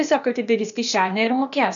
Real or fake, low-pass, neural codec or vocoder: fake; 7.2 kHz; codec, 16 kHz, 0.8 kbps, ZipCodec